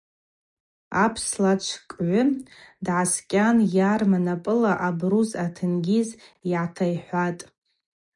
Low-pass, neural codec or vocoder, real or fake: 10.8 kHz; none; real